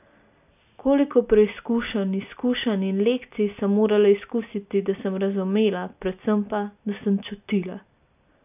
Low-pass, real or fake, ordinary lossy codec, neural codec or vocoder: 3.6 kHz; real; none; none